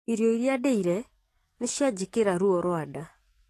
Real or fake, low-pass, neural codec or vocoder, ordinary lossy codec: fake; 14.4 kHz; codec, 44.1 kHz, 7.8 kbps, DAC; AAC, 48 kbps